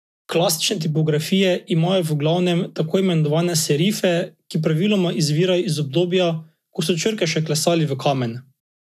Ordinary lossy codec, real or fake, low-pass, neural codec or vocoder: none; real; 14.4 kHz; none